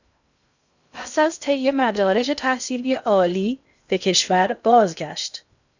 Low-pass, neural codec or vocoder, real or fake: 7.2 kHz; codec, 16 kHz in and 24 kHz out, 0.6 kbps, FocalCodec, streaming, 2048 codes; fake